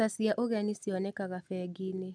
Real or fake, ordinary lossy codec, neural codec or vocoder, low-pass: real; none; none; none